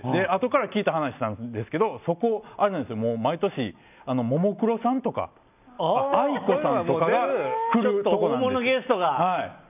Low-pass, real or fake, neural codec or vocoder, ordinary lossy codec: 3.6 kHz; real; none; none